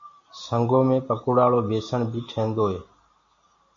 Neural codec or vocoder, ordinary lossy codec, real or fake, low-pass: none; MP3, 48 kbps; real; 7.2 kHz